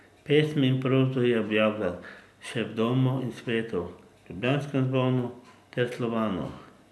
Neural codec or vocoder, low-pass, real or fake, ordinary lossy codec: none; none; real; none